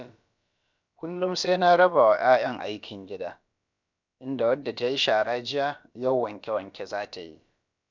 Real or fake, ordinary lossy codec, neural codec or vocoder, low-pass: fake; none; codec, 16 kHz, about 1 kbps, DyCAST, with the encoder's durations; 7.2 kHz